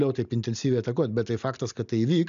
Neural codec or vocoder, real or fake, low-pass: none; real; 7.2 kHz